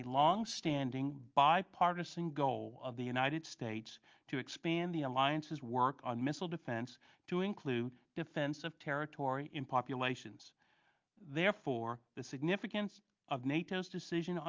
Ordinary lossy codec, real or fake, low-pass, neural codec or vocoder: Opus, 24 kbps; real; 7.2 kHz; none